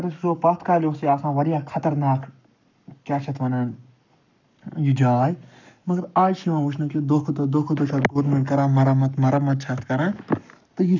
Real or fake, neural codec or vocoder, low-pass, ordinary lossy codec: fake; codec, 44.1 kHz, 7.8 kbps, Pupu-Codec; 7.2 kHz; none